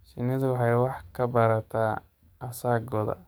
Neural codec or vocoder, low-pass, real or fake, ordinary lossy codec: codec, 44.1 kHz, 7.8 kbps, DAC; none; fake; none